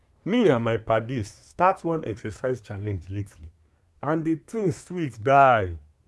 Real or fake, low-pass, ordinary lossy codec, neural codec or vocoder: fake; none; none; codec, 24 kHz, 1 kbps, SNAC